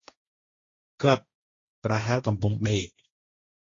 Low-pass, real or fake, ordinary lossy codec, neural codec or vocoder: 7.2 kHz; fake; AAC, 32 kbps; codec, 16 kHz, 1.1 kbps, Voila-Tokenizer